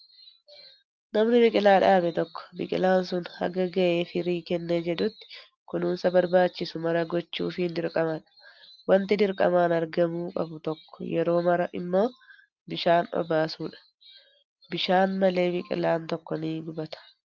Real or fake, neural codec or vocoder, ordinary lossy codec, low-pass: real; none; Opus, 32 kbps; 7.2 kHz